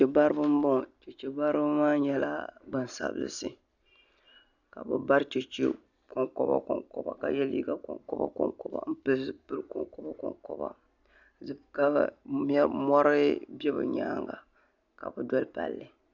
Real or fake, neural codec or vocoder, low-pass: real; none; 7.2 kHz